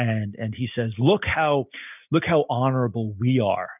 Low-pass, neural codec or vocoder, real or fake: 3.6 kHz; none; real